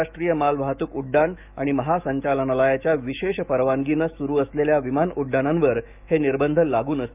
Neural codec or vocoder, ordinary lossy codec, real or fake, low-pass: autoencoder, 48 kHz, 128 numbers a frame, DAC-VAE, trained on Japanese speech; none; fake; 3.6 kHz